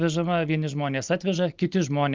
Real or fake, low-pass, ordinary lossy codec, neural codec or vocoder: real; 7.2 kHz; Opus, 32 kbps; none